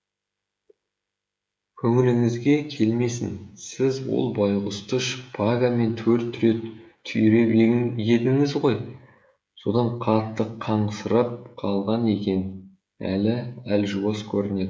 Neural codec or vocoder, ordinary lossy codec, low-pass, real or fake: codec, 16 kHz, 16 kbps, FreqCodec, smaller model; none; none; fake